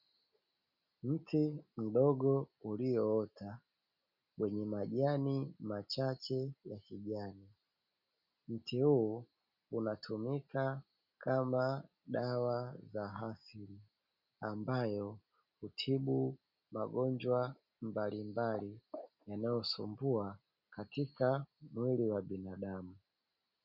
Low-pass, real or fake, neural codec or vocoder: 5.4 kHz; real; none